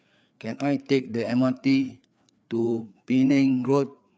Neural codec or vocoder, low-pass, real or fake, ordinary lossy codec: codec, 16 kHz, 4 kbps, FreqCodec, larger model; none; fake; none